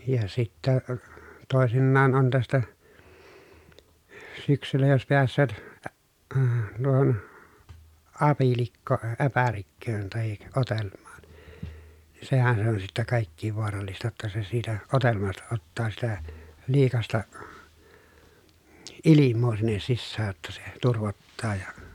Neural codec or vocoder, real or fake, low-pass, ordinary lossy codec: none; real; 19.8 kHz; none